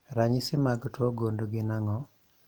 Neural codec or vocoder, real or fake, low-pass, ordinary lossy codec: none; real; 19.8 kHz; Opus, 24 kbps